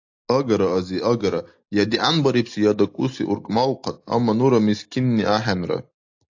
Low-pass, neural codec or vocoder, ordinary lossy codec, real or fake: 7.2 kHz; none; AAC, 48 kbps; real